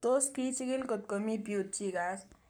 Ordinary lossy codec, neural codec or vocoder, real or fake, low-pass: none; codec, 44.1 kHz, 7.8 kbps, Pupu-Codec; fake; none